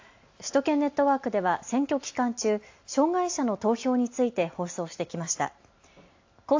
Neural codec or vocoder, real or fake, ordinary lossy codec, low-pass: none; real; AAC, 48 kbps; 7.2 kHz